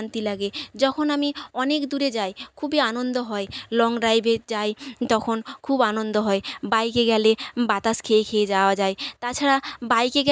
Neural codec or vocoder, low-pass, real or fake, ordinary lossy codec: none; none; real; none